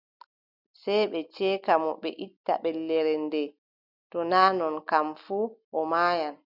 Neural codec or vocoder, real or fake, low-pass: none; real; 5.4 kHz